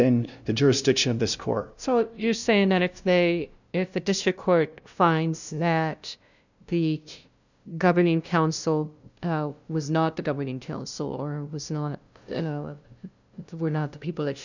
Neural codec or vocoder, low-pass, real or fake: codec, 16 kHz, 0.5 kbps, FunCodec, trained on LibriTTS, 25 frames a second; 7.2 kHz; fake